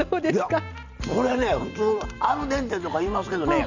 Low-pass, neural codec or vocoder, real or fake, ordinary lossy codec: 7.2 kHz; none; real; none